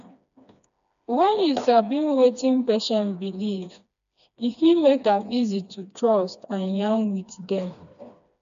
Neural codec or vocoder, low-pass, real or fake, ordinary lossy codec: codec, 16 kHz, 2 kbps, FreqCodec, smaller model; 7.2 kHz; fake; none